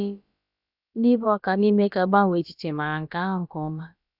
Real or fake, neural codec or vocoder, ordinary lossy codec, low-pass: fake; codec, 16 kHz, about 1 kbps, DyCAST, with the encoder's durations; none; 5.4 kHz